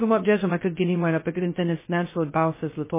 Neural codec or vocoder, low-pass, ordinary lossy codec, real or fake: codec, 16 kHz, 0.2 kbps, FocalCodec; 3.6 kHz; MP3, 16 kbps; fake